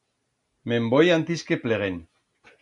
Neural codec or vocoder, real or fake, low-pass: none; real; 10.8 kHz